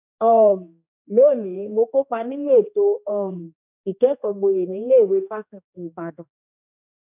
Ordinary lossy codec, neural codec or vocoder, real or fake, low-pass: none; codec, 16 kHz, 2 kbps, X-Codec, HuBERT features, trained on general audio; fake; 3.6 kHz